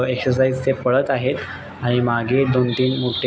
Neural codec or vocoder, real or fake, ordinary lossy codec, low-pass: none; real; none; none